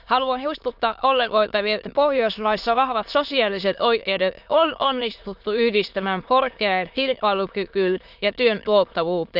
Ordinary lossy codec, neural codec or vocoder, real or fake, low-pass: none; autoencoder, 22.05 kHz, a latent of 192 numbers a frame, VITS, trained on many speakers; fake; 5.4 kHz